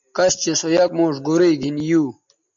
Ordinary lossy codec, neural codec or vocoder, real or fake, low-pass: AAC, 64 kbps; none; real; 7.2 kHz